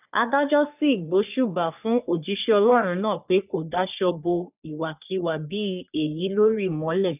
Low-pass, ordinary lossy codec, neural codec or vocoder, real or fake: 3.6 kHz; none; codec, 44.1 kHz, 3.4 kbps, Pupu-Codec; fake